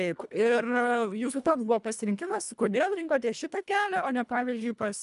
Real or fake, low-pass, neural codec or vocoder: fake; 10.8 kHz; codec, 24 kHz, 1.5 kbps, HILCodec